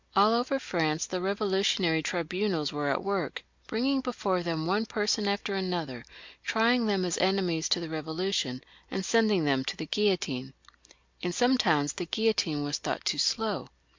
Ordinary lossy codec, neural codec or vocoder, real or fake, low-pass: MP3, 64 kbps; none; real; 7.2 kHz